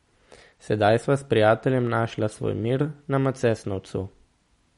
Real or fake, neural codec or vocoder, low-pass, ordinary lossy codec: real; none; 19.8 kHz; MP3, 48 kbps